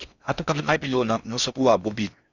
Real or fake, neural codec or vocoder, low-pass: fake; codec, 16 kHz in and 24 kHz out, 0.6 kbps, FocalCodec, streaming, 4096 codes; 7.2 kHz